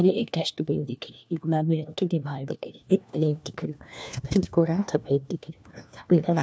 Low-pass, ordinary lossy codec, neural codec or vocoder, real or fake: none; none; codec, 16 kHz, 1 kbps, FunCodec, trained on LibriTTS, 50 frames a second; fake